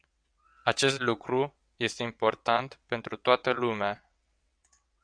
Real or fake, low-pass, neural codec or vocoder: fake; 9.9 kHz; vocoder, 22.05 kHz, 80 mel bands, WaveNeXt